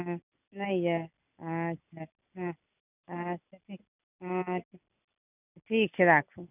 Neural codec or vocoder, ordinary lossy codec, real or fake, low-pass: none; none; real; 3.6 kHz